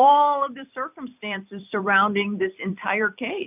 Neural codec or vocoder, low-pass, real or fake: vocoder, 44.1 kHz, 128 mel bands every 256 samples, BigVGAN v2; 3.6 kHz; fake